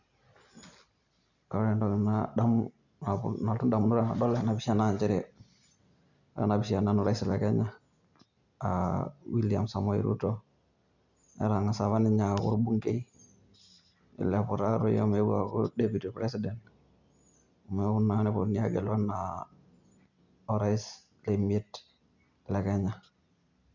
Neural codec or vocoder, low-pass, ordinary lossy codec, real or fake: none; 7.2 kHz; none; real